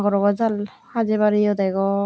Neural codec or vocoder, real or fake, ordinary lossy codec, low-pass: none; real; none; none